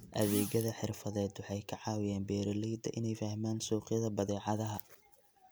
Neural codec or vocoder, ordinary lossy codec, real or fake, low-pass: none; none; real; none